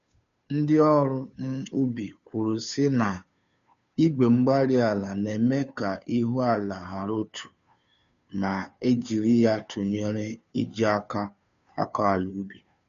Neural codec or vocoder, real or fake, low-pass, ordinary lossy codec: codec, 16 kHz, 2 kbps, FunCodec, trained on Chinese and English, 25 frames a second; fake; 7.2 kHz; none